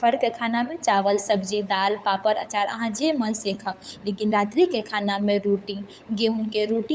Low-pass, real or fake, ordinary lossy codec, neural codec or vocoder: none; fake; none; codec, 16 kHz, 8 kbps, FunCodec, trained on LibriTTS, 25 frames a second